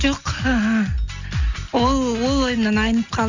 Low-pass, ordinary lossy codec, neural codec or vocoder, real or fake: 7.2 kHz; none; none; real